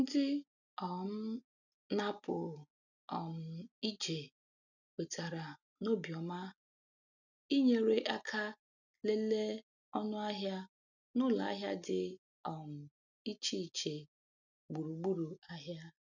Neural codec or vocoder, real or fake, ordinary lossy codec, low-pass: none; real; none; 7.2 kHz